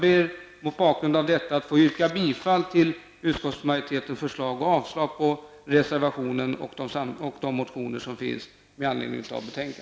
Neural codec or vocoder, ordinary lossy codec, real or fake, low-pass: none; none; real; none